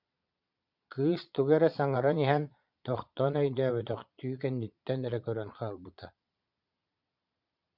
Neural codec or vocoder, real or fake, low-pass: vocoder, 22.05 kHz, 80 mel bands, Vocos; fake; 5.4 kHz